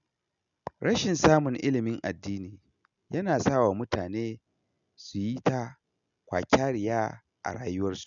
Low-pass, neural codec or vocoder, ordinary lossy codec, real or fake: 7.2 kHz; none; none; real